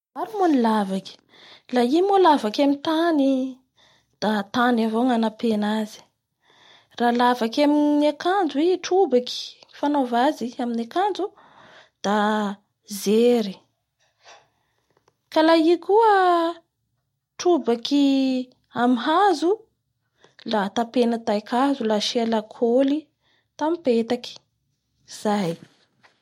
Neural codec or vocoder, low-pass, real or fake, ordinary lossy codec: none; 19.8 kHz; real; MP3, 64 kbps